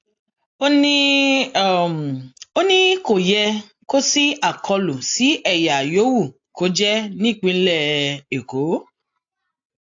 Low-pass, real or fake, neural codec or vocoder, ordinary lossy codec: 7.2 kHz; real; none; AAC, 48 kbps